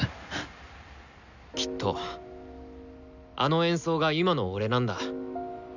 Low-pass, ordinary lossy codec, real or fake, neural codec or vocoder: 7.2 kHz; none; real; none